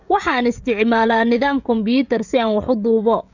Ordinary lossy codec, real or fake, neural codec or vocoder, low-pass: none; fake; codec, 16 kHz, 16 kbps, FreqCodec, smaller model; 7.2 kHz